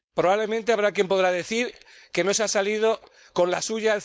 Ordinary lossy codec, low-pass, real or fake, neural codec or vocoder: none; none; fake; codec, 16 kHz, 4.8 kbps, FACodec